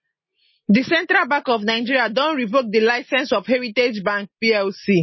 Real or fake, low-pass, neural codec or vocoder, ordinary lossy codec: real; 7.2 kHz; none; MP3, 24 kbps